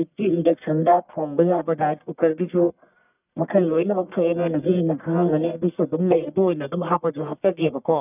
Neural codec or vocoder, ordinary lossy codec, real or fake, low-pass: codec, 44.1 kHz, 1.7 kbps, Pupu-Codec; none; fake; 3.6 kHz